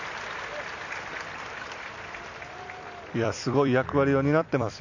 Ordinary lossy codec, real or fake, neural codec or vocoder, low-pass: none; fake; vocoder, 44.1 kHz, 128 mel bands every 256 samples, BigVGAN v2; 7.2 kHz